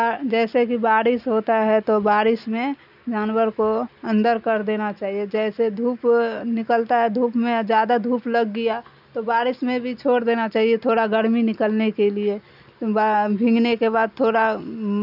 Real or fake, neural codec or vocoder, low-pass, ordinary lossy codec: real; none; 5.4 kHz; none